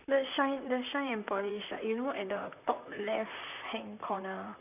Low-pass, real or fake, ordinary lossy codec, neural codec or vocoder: 3.6 kHz; fake; none; vocoder, 44.1 kHz, 128 mel bands, Pupu-Vocoder